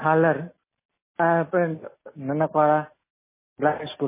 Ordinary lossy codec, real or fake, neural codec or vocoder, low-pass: MP3, 16 kbps; real; none; 3.6 kHz